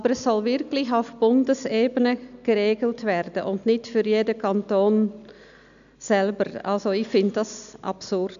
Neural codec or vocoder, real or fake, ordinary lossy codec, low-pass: none; real; none; 7.2 kHz